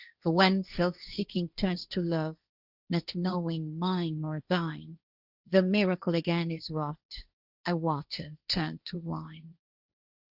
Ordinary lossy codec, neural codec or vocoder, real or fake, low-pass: Opus, 64 kbps; codec, 16 kHz, 1.1 kbps, Voila-Tokenizer; fake; 5.4 kHz